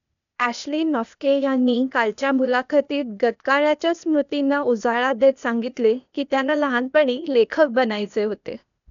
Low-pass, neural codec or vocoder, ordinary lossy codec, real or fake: 7.2 kHz; codec, 16 kHz, 0.8 kbps, ZipCodec; none; fake